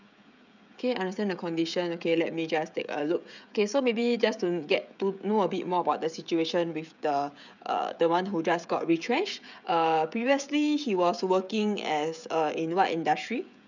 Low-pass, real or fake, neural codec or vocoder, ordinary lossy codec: 7.2 kHz; fake; codec, 16 kHz, 16 kbps, FreqCodec, smaller model; none